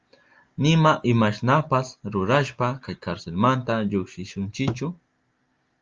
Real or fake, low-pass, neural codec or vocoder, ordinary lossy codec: real; 7.2 kHz; none; Opus, 32 kbps